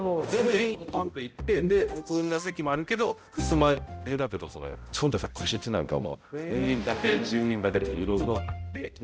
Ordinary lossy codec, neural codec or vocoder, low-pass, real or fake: none; codec, 16 kHz, 0.5 kbps, X-Codec, HuBERT features, trained on balanced general audio; none; fake